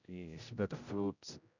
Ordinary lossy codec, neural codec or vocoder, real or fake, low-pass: none; codec, 16 kHz, 0.5 kbps, X-Codec, HuBERT features, trained on general audio; fake; 7.2 kHz